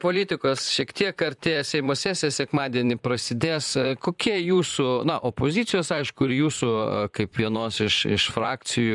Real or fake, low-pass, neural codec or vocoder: fake; 10.8 kHz; vocoder, 44.1 kHz, 128 mel bands, Pupu-Vocoder